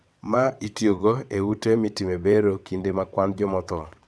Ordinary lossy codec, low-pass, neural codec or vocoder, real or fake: none; none; vocoder, 22.05 kHz, 80 mel bands, WaveNeXt; fake